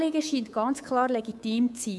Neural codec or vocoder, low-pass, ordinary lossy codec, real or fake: codec, 24 kHz, 3.1 kbps, DualCodec; none; none; fake